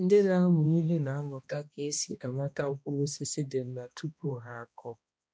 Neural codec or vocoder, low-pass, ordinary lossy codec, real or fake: codec, 16 kHz, 1 kbps, X-Codec, HuBERT features, trained on balanced general audio; none; none; fake